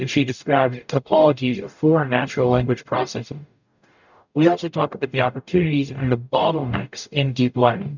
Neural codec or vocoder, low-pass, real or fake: codec, 44.1 kHz, 0.9 kbps, DAC; 7.2 kHz; fake